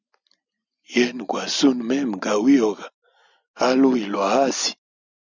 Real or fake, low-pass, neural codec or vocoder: real; 7.2 kHz; none